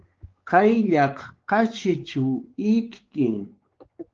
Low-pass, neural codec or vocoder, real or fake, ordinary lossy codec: 7.2 kHz; codec, 16 kHz, 4 kbps, X-Codec, WavLM features, trained on Multilingual LibriSpeech; fake; Opus, 16 kbps